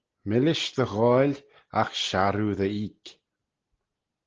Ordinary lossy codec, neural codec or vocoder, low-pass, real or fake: Opus, 16 kbps; none; 7.2 kHz; real